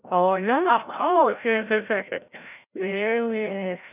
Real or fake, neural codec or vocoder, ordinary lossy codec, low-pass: fake; codec, 16 kHz, 0.5 kbps, FreqCodec, larger model; none; 3.6 kHz